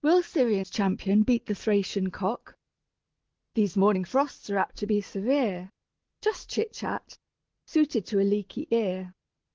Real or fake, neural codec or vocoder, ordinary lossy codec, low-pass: real; none; Opus, 16 kbps; 7.2 kHz